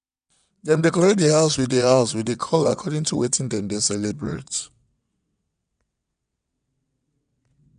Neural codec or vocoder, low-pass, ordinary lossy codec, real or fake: vocoder, 22.05 kHz, 80 mel bands, WaveNeXt; 9.9 kHz; none; fake